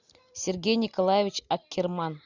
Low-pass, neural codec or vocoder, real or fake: 7.2 kHz; none; real